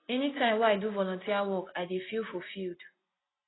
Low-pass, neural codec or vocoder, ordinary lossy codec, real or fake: 7.2 kHz; none; AAC, 16 kbps; real